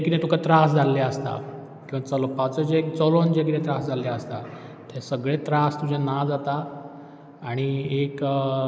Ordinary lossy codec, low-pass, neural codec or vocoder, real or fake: none; none; none; real